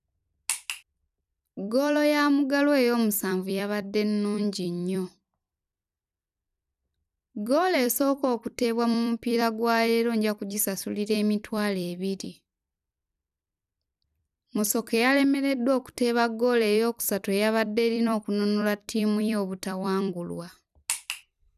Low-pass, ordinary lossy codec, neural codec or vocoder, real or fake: 14.4 kHz; none; vocoder, 44.1 kHz, 128 mel bands every 256 samples, BigVGAN v2; fake